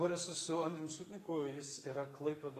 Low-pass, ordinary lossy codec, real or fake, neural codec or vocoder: 14.4 kHz; AAC, 48 kbps; fake; codec, 44.1 kHz, 2.6 kbps, SNAC